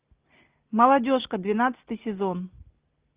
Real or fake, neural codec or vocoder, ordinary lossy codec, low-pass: real; none; Opus, 24 kbps; 3.6 kHz